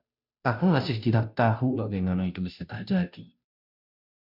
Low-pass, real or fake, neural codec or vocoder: 5.4 kHz; fake; codec, 16 kHz, 0.5 kbps, FunCodec, trained on Chinese and English, 25 frames a second